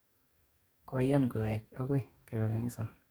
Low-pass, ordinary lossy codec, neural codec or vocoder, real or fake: none; none; codec, 44.1 kHz, 2.6 kbps, DAC; fake